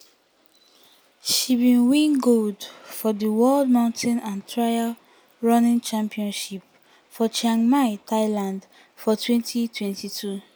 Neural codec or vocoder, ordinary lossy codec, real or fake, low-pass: none; none; real; none